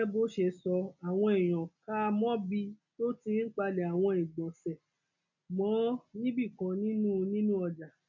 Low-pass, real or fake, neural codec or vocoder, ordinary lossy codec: 7.2 kHz; real; none; none